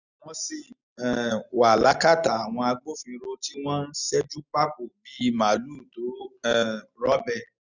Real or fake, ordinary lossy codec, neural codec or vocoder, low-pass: real; none; none; 7.2 kHz